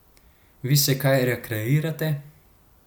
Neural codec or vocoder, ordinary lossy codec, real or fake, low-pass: none; none; real; none